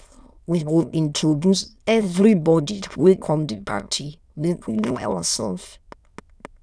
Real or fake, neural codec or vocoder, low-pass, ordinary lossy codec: fake; autoencoder, 22.05 kHz, a latent of 192 numbers a frame, VITS, trained on many speakers; none; none